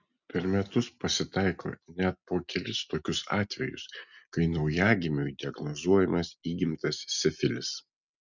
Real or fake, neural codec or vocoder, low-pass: real; none; 7.2 kHz